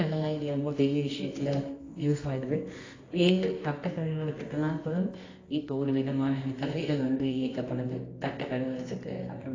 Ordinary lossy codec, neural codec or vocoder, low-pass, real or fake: AAC, 32 kbps; codec, 24 kHz, 0.9 kbps, WavTokenizer, medium music audio release; 7.2 kHz; fake